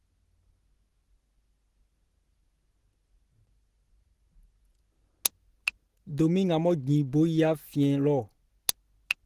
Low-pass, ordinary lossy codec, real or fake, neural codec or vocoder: 14.4 kHz; Opus, 16 kbps; real; none